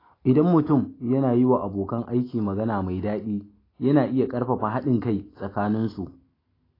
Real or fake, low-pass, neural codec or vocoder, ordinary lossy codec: real; 5.4 kHz; none; AAC, 24 kbps